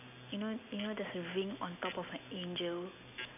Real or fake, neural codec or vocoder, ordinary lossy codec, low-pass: real; none; none; 3.6 kHz